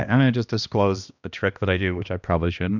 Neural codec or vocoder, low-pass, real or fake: codec, 16 kHz, 1 kbps, X-Codec, HuBERT features, trained on balanced general audio; 7.2 kHz; fake